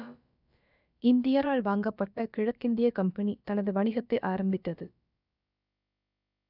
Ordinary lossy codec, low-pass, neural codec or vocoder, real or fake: none; 5.4 kHz; codec, 16 kHz, about 1 kbps, DyCAST, with the encoder's durations; fake